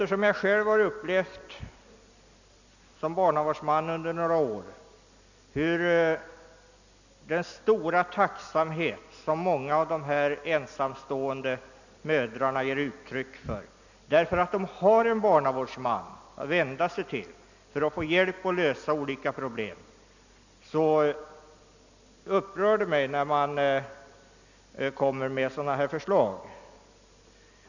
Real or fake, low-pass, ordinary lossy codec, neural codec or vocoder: real; 7.2 kHz; none; none